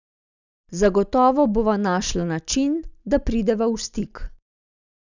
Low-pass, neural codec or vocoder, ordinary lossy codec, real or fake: 7.2 kHz; none; none; real